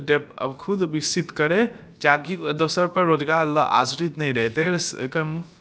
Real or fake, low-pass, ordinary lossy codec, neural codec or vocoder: fake; none; none; codec, 16 kHz, about 1 kbps, DyCAST, with the encoder's durations